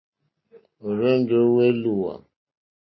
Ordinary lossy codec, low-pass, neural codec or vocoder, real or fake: MP3, 24 kbps; 7.2 kHz; none; real